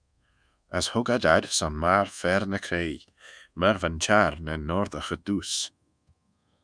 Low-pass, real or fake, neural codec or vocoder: 9.9 kHz; fake; codec, 24 kHz, 1.2 kbps, DualCodec